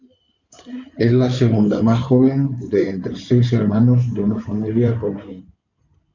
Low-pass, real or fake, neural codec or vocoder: 7.2 kHz; fake; codec, 16 kHz in and 24 kHz out, 2.2 kbps, FireRedTTS-2 codec